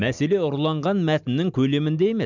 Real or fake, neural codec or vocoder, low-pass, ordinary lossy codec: real; none; 7.2 kHz; none